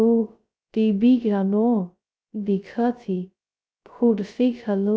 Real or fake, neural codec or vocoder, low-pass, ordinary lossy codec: fake; codec, 16 kHz, 0.2 kbps, FocalCodec; none; none